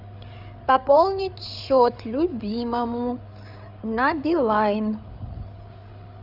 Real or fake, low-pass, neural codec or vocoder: fake; 5.4 kHz; codec, 16 kHz, 8 kbps, FreqCodec, larger model